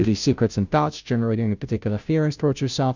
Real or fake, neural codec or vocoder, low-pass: fake; codec, 16 kHz, 0.5 kbps, FunCodec, trained on Chinese and English, 25 frames a second; 7.2 kHz